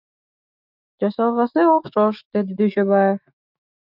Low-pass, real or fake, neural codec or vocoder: 5.4 kHz; fake; autoencoder, 48 kHz, 128 numbers a frame, DAC-VAE, trained on Japanese speech